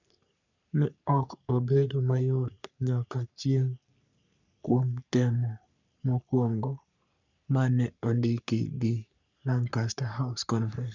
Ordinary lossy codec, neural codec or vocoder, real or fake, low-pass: none; codec, 44.1 kHz, 3.4 kbps, Pupu-Codec; fake; 7.2 kHz